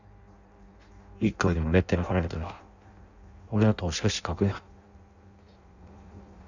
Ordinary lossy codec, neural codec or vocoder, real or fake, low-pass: none; codec, 16 kHz in and 24 kHz out, 0.6 kbps, FireRedTTS-2 codec; fake; 7.2 kHz